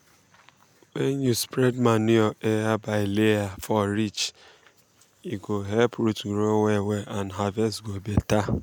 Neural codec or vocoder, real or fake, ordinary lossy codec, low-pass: none; real; none; 19.8 kHz